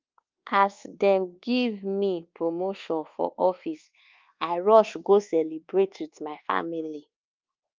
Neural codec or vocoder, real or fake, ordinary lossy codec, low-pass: codec, 24 kHz, 1.2 kbps, DualCodec; fake; Opus, 32 kbps; 7.2 kHz